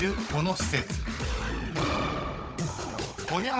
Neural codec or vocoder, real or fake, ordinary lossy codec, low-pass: codec, 16 kHz, 16 kbps, FunCodec, trained on Chinese and English, 50 frames a second; fake; none; none